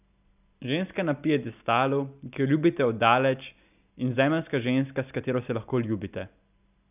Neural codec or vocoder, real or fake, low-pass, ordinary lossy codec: none; real; 3.6 kHz; none